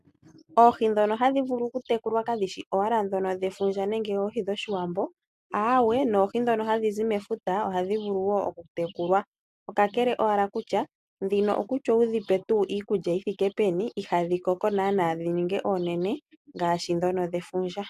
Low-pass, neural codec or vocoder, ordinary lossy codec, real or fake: 14.4 kHz; none; AAC, 96 kbps; real